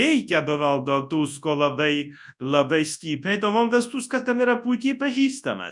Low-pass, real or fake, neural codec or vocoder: 10.8 kHz; fake; codec, 24 kHz, 0.9 kbps, WavTokenizer, large speech release